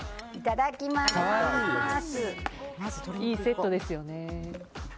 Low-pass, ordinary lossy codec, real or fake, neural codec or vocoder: none; none; real; none